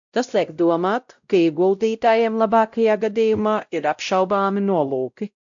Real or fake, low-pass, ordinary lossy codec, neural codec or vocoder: fake; 7.2 kHz; AAC, 64 kbps; codec, 16 kHz, 0.5 kbps, X-Codec, WavLM features, trained on Multilingual LibriSpeech